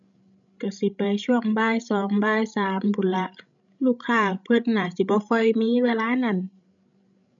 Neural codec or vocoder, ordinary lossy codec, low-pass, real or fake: codec, 16 kHz, 16 kbps, FreqCodec, larger model; none; 7.2 kHz; fake